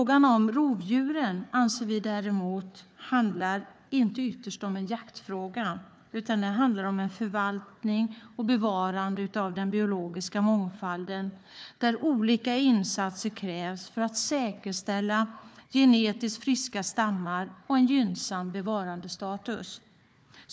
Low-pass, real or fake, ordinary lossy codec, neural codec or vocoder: none; fake; none; codec, 16 kHz, 4 kbps, FunCodec, trained on Chinese and English, 50 frames a second